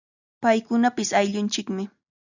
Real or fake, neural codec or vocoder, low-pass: real; none; 7.2 kHz